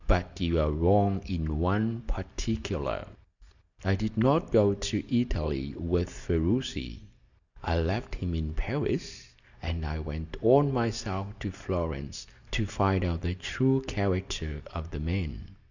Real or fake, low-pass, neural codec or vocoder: real; 7.2 kHz; none